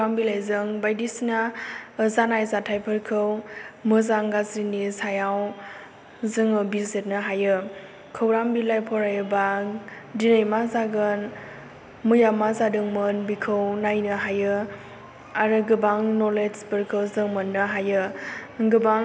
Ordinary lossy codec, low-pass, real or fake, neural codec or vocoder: none; none; real; none